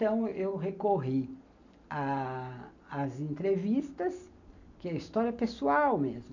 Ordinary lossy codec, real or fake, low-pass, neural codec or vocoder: none; real; 7.2 kHz; none